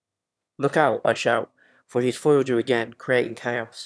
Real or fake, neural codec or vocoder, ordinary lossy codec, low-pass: fake; autoencoder, 22.05 kHz, a latent of 192 numbers a frame, VITS, trained on one speaker; none; none